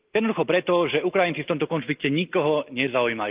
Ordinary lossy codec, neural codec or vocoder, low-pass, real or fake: Opus, 32 kbps; none; 3.6 kHz; real